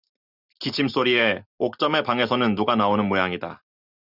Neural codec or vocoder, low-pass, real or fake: none; 5.4 kHz; real